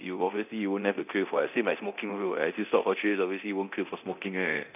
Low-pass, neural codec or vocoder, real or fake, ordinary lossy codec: 3.6 kHz; codec, 24 kHz, 0.9 kbps, DualCodec; fake; none